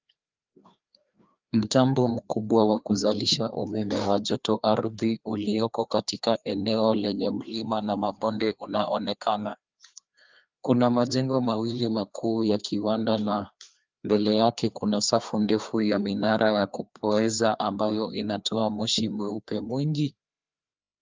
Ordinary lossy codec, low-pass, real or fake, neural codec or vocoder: Opus, 24 kbps; 7.2 kHz; fake; codec, 16 kHz, 2 kbps, FreqCodec, larger model